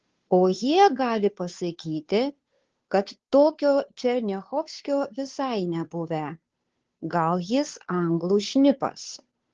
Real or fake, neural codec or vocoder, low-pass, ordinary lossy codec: fake; codec, 16 kHz, 2 kbps, FunCodec, trained on Chinese and English, 25 frames a second; 7.2 kHz; Opus, 16 kbps